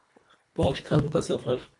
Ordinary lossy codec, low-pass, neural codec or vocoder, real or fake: AAC, 48 kbps; 10.8 kHz; codec, 24 kHz, 1.5 kbps, HILCodec; fake